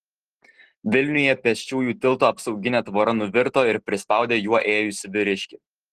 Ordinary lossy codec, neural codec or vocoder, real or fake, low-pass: Opus, 16 kbps; none; real; 14.4 kHz